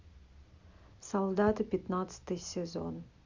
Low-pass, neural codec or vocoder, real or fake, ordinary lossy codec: 7.2 kHz; none; real; Opus, 64 kbps